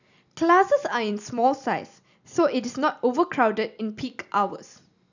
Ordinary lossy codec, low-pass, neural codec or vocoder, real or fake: none; 7.2 kHz; none; real